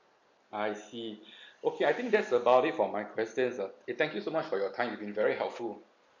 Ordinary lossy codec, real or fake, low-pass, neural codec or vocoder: MP3, 64 kbps; real; 7.2 kHz; none